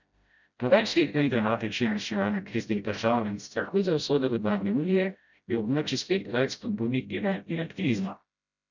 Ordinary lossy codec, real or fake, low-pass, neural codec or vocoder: none; fake; 7.2 kHz; codec, 16 kHz, 0.5 kbps, FreqCodec, smaller model